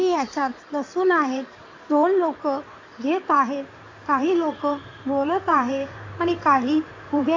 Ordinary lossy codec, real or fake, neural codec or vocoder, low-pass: AAC, 48 kbps; fake; codec, 16 kHz in and 24 kHz out, 2.2 kbps, FireRedTTS-2 codec; 7.2 kHz